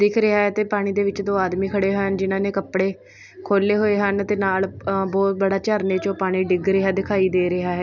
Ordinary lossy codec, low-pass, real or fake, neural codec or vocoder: none; 7.2 kHz; real; none